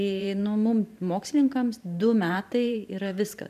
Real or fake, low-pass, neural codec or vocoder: fake; 14.4 kHz; vocoder, 44.1 kHz, 128 mel bands every 512 samples, BigVGAN v2